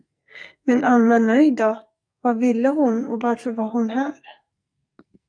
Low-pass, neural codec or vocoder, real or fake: 9.9 kHz; codec, 44.1 kHz, 2.6 kbps, SNAC; fake